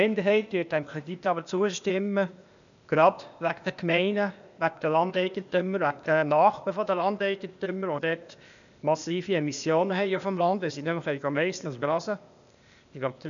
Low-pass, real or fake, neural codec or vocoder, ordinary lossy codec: 7.2 kHz; fake; codec, 16 kHz, 0.8 kbps, ZipCodec; none